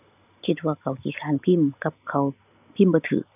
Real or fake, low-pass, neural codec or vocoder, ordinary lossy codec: real; 3.6 kHz; none; none